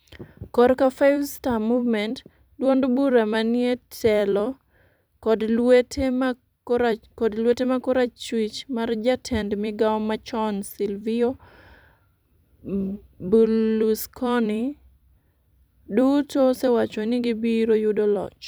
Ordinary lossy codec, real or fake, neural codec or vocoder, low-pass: none; fake; vocoder, 44.1 kHz, 128 mel bands every 256 samples, BigVGAN v2; none